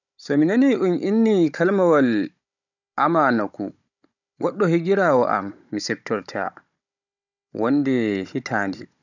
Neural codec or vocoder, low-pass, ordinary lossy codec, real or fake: codec, 16 kHz, 16 kbps, FunCodec, trained on Chinese and English, 50 frames a second; 7.2 kHz; none; fake